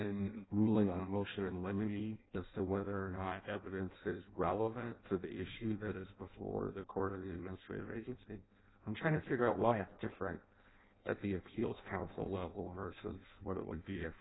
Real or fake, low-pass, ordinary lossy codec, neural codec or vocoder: fake; 7.2 kHz; AAC, 16 kbps; codec, 16 kHz in and 24 kHz out, 0.6 kbps, FireRedTTS-2 codec